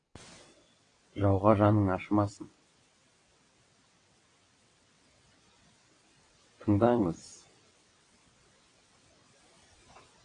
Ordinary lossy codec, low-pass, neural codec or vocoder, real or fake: AAC, 32 kbps; 9.9 kHz; vocoder, 22.05 kHz, 80 mel bands, WaveNeXt; fake